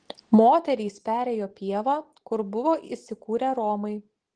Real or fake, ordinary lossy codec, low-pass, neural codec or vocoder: real; Opus, 16 kbps; 9.9 kHz; none